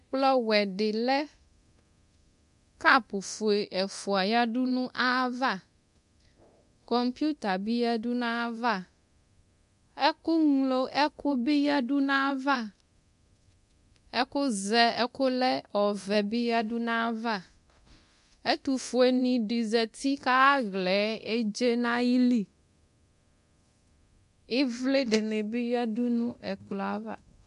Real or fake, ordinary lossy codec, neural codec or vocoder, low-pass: fake; MP3, 64 kbps; codec, 24 kHz, 0.9 kbps, DualCodec; 10.8 kHz